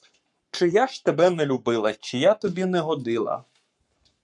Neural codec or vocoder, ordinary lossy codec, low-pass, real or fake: codec, 44.1 kHz, 7.8 kbps, Pupu-Codec; MP3, 96 kbps; 10.8 kHz; fake